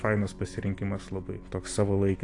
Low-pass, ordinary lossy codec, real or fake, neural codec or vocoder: 10.8 kHz; AAC, 48 kbps; real; none